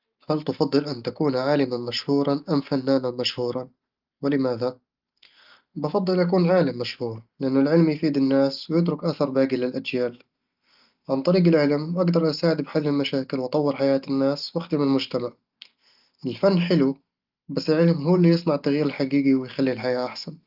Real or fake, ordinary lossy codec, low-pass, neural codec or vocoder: real; Opus, 24 kbps; 5.4 kHz; none